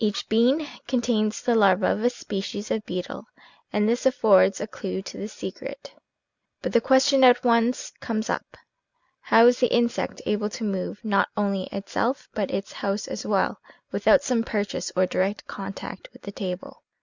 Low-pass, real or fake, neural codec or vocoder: 7.2 kHz; real; none